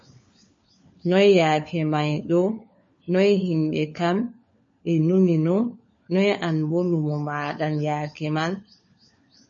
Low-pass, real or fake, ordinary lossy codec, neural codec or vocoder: 7.2 kHz; fake; MP3, 32 kbps; codec, 16 kHz, 4 kbps, FunCodec, trained on LibriTTS, 50 frames a second